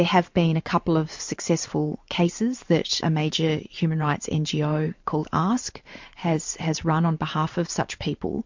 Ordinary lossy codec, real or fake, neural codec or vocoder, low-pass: MP3, 48 kbps; fake; vocoder, 44.1 kHz, 128 mel bands every 512 samples, BigVGAN v2; 7.2 kHz